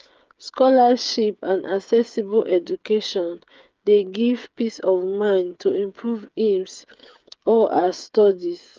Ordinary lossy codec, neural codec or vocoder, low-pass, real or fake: Opus, 24 kbps; codec, 16 kHz, 8 kbps, FreqCodec, smaller model; 7.2 kHz; fake